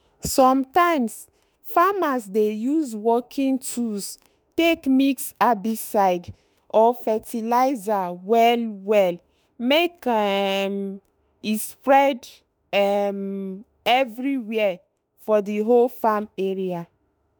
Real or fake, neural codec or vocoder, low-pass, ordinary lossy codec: fake; autoencoder, 48 kHz, 32 numbers a frame, DAC-VAE, trained on Japanese speech; none; none